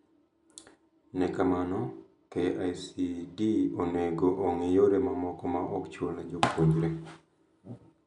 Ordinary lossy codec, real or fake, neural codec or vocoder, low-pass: none; real; none; 10.8 kHz